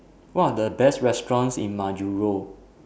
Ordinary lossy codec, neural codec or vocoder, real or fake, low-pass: none; none; real; none